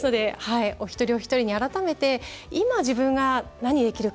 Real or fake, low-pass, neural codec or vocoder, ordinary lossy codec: real; none; none; none